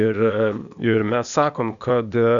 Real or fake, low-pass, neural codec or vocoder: fake; 7.2 kHz; codec, 16 kHz, 0.8 kbps, ZipCodec